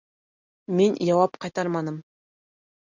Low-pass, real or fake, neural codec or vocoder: 7.2 kHz; real; none